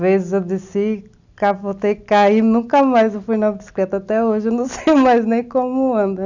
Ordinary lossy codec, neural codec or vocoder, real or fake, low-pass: none; none; real; 7.2 kHz